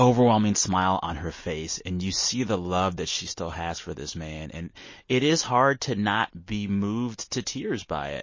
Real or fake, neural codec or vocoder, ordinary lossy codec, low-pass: real; none; MP3, 32 kbps; 7.2 kHz